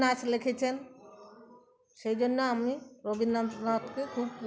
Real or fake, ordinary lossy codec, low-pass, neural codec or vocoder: real; none; none; none